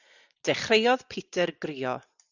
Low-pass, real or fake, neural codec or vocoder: 7.2 kHz; real; none